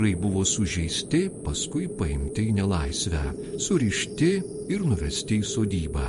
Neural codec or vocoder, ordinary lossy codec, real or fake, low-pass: vocoder, 48 kHz, 128 mel bands, Vocos; MP3, 48 kbps; fake; 14.4 kHz